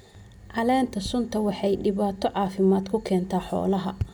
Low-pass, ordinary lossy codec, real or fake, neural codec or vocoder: none; none; real; none